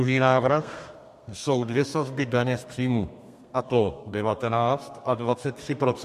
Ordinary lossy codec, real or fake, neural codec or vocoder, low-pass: MP3, 64 kbps; fake; codec, 32 kHz, 1.9 kbps, SNAC; 14.4 kHz